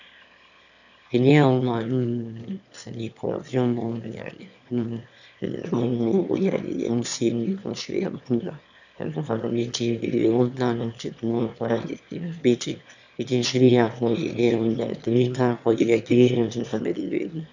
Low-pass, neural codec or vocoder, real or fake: 7.2 kHz; autoencoder, 22.05 kHz, a latent of 192 numbers a frame, VITS, trained on one speaker; fake